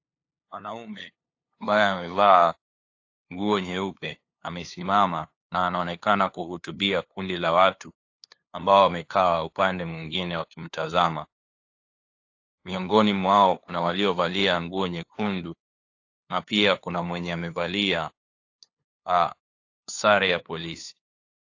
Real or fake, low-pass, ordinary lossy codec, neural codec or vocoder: fake; 7.2 kHz; AAC, 48 kbps; codec, 16 kHz, 2 kbps, FunCodec, trained on LibriTTS, 25 frames a second